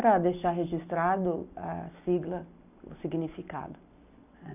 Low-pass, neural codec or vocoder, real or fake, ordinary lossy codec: 3.6 kHz; none; real; none